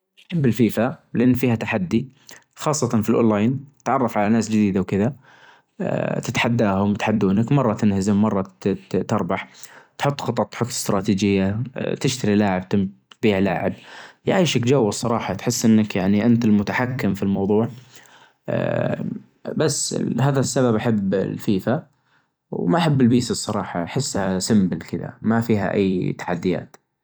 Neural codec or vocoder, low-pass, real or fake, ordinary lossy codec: vocoder, 44.1 kHz, 128 mel bands every 256 samples, BigVGAN v2; none; fake; none